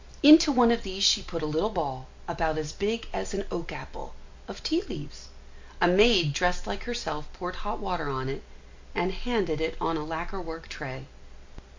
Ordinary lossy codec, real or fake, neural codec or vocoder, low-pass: MP3, 48 kbps; real; none; 7.2 kHz